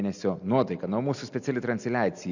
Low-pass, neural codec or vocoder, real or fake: 7.2 kHz; none; real